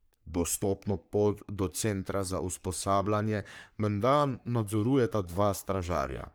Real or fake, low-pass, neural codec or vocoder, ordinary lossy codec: fake; none; codec, 44.1 kHz, 3.4 kbps, Pupu-Codec; none